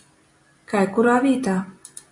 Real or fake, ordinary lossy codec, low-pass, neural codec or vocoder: real; AAC, 64 kbps; 10.8 kHz; none